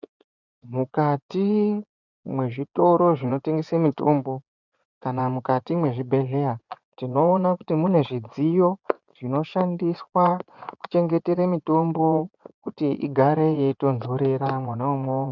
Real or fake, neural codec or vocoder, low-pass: fake; vocoder, 24 kHz, 100 mel bands, Vocos; 7.2 kHz